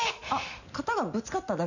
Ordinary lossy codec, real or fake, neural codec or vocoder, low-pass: none; real; none; 7.2 kHz